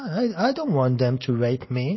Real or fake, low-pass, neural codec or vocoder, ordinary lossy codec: real; 7.2 kHz; none; MP3, 24 kbps